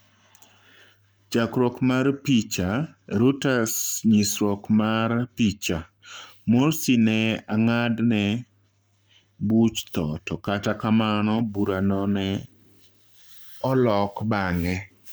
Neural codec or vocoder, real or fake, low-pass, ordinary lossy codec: codec, 44.1 kHz, 7.8 kbps, Pupu-Codec; fake; none; none